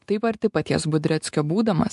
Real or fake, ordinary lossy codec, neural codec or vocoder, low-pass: real; MP3, 64 kbps; none; 10.8 kHz